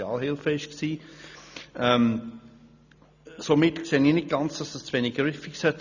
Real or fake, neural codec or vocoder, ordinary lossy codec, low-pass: real; none; none; 7.2 kHz